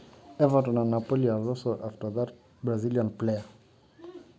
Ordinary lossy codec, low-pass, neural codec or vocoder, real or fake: none; none; none; real